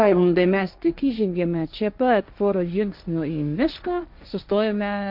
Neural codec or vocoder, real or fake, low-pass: codec, 16 kHz, 1.1 kbps, Voila-Tokenizer; fake; 5.4 kHz